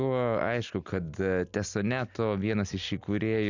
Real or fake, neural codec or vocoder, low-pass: real; none; 7.2 kHz